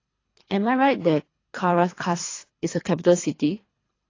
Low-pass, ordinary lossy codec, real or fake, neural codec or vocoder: 7.2 kHz; AAC, 32 kbps; fake; codec, 24 kHz, 3 kbps, HILCodec